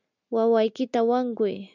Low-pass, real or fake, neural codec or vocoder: 7.2 kHz; real; none